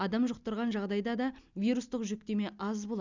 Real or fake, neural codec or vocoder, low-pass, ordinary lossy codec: real; none; 7.2 kHz; Opus, 64 kbps